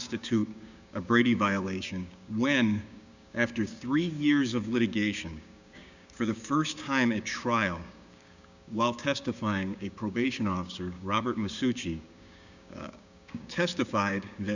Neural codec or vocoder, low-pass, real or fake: codec, 16 kHz, 6 kbps, DAC; 7.2 kHz; fake